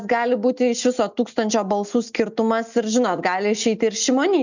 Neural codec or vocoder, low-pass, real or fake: none; 7.2 kHz; real